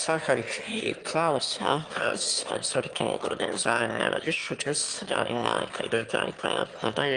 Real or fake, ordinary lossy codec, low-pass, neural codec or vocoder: fake; Opus, 32 kbps; 9.9 kHz; autoencoder, 22.05 kHz, a latent of 192 numbers a frame, VITS, trained on one speaker